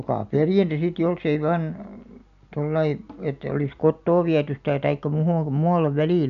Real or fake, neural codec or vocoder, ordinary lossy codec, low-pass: real; none; none; 7.2 kHz